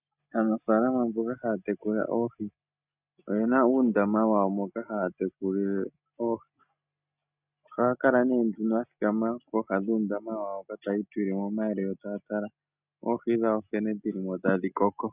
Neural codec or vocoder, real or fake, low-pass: none; real; 3.6 kHz